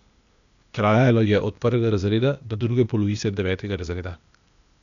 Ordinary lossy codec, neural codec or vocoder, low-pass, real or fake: none; codec, 16 kHz, 0.8 kbps, ZipCodec; 7.2 kHz; fake